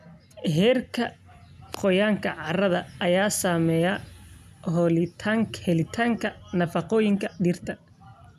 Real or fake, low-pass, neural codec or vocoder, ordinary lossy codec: real; 14.4 kHz; none; none